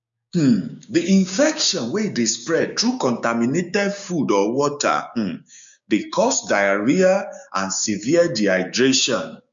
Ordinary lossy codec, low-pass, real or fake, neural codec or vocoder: MP3, 64 kbps; 7.2 kHz; fake; codec, 16 kHz, 6 kbps, DAC